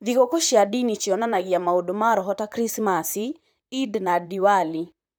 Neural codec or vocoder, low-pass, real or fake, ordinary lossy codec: vocoder, 44.1 kHz, 128 mel bands, Pupu-Vocoder; none; fake; none